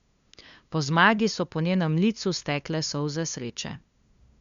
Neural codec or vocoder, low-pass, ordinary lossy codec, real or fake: codec, 16 kHz, 2 kbps, FunCodec, trained on LibriTTS, 25 frames a second; 7.2 kHz; Opus, 64 kbps; fake